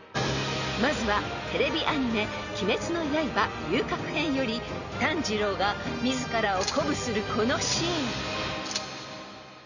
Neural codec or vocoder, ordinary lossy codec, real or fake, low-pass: none; none; real; 7.2 kHz